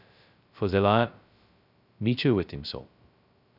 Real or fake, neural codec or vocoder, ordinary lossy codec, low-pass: fake; codec, 16 kHz, 0.2 kbps, FocalCodec; none; 5.4 kHz